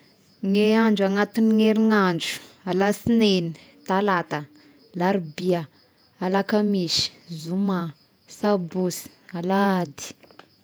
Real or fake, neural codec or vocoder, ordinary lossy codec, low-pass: fake; vocoder, 48 kHz, 128 mel bands, Vocos; none; none